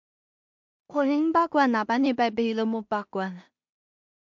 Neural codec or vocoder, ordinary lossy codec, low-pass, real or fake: codec, 16 kHz in and 24 kHz out, 0.4 kbps, LongCat-Audio-Codec, two codebook decoder; MP3, 64 kbps; 7.2 kHz; fake